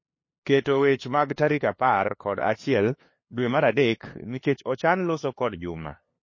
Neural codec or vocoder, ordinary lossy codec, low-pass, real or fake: codec, 16 kHz, 2 kbps, FunCodec, trained on LibriTTS, 25 frames a second; MP3, 32 kbps; 7.2 kHz; fake